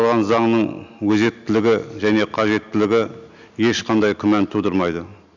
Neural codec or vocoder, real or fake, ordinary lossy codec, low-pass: none; real; none; 7.2 kHz